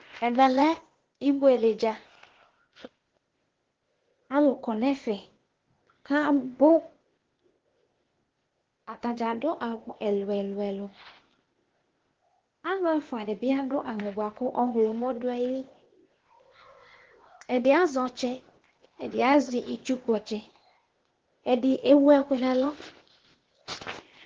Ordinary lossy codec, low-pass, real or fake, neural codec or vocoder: Opus, 16 kbps; 7.2 kHz; fake; codec, 16 kHz, 0.8 kbps, ZipCodec